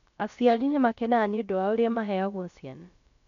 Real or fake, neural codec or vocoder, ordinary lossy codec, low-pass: fake; codec, 16 kHz, about 1 kbps, DyCAST, with the encoder's durations; none; 7.2 kHz